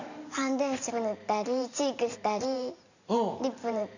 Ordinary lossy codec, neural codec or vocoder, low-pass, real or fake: none; vocoder, 44.1 kHz, 128 mel bands every 512 samples, BigVGAN v2; 7.2 kHz; fake